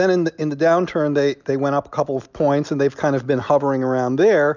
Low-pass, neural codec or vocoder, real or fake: 7.2 kHz; none; real